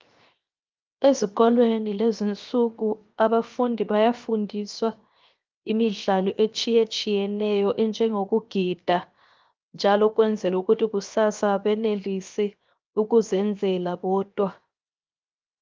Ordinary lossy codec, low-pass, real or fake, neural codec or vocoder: Opus, 32 kbps; 7.2 kHz; fake; codec, 16 kHz, 0.7 kbps, FocalCodec